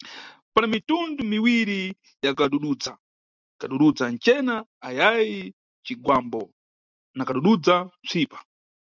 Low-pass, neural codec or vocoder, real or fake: 7.2 kHz; none; real